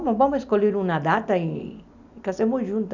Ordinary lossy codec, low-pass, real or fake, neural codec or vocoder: none; 7.2 kHz; real; none